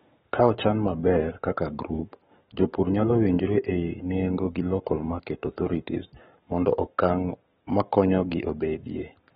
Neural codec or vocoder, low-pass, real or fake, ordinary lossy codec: codec, 44.1 kHz, 7.8 kbps, Pupu-Codec; 19.8 kHz; fake; AAC, 16 kbps